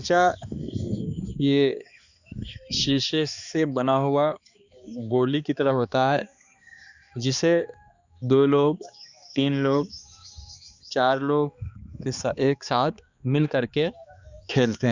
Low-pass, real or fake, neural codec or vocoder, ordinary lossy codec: 7.2 kHz; fake; codec, 16 kHz, 2 kbps, X-Codec, HuBERT features, trained on balanced general audio; Opus, 64 kbps